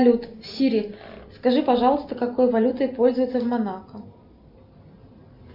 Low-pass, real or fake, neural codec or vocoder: 5.4 kHz; real; none